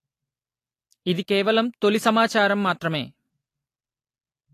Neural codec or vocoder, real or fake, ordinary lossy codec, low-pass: none; real; AAC, 48 kbps; 14.4 kHz